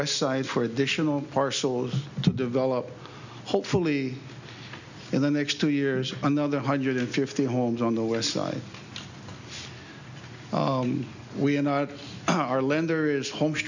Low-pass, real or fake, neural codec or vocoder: 7.2 kHz; real; none